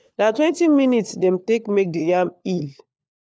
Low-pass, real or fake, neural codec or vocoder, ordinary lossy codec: none; fake; codec, 16 kHz, 4 kbps, FunCodec, trained on LibriTTS, 50 frames a second; none